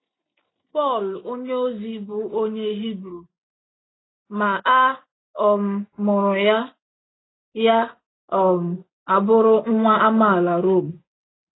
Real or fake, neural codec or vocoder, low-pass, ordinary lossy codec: real; none; 7.2 kHz; AAC, 16 kbps